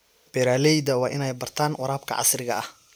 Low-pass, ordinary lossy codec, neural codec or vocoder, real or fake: none; none; none; real